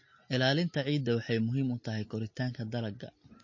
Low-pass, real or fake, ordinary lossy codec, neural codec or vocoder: 7.2 kHz; real; MP3, 32 kbps; none